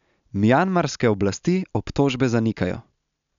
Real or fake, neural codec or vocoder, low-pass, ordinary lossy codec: real; none; 7.2 kHz; none